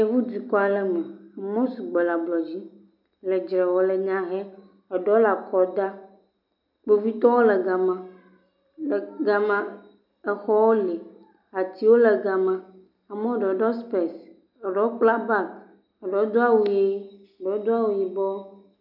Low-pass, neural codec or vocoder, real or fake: 5.4 kHz; none; real